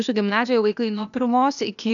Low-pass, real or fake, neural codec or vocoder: 7.2 kHz; fake; codec, 16 kHz, 0.8 kbps, ZipCodec